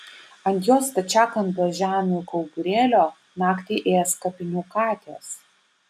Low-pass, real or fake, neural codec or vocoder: 14.4 kHz; real; none